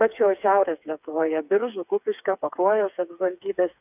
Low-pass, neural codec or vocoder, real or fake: 3.6 kHz; codec, 16 kHz, 4 kbps, FreqCodec, smaller model; fake